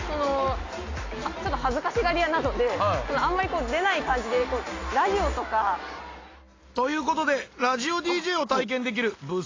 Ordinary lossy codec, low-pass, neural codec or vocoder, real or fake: AAC, 32 kbps; 7.2 kHz; none; real